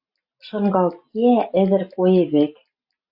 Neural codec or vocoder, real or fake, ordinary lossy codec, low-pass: none; real; AAC, 48 kbps; 5.4 kHz